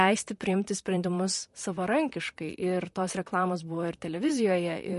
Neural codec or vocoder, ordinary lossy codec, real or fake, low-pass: vocoder, 44.1 kHz, 128 mel bands, Pupu-Vocoder; MP3, 48 kbps; fake; 14.4 kHz